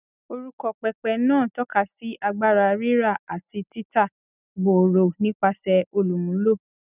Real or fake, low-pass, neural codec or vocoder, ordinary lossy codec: real; 3.6 kHz; none; none